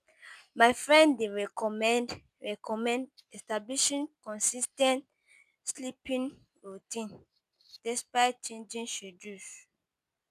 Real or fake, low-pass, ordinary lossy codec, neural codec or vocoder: real; 14.4 kHz; none; none